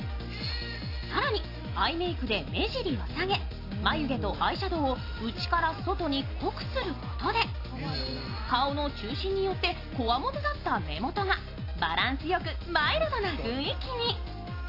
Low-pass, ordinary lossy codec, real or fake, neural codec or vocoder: 5.4 kHz; AAC, 32 kbps; real; none